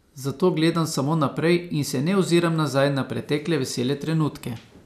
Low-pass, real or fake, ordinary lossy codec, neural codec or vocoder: 14.4 kHz; real; none; none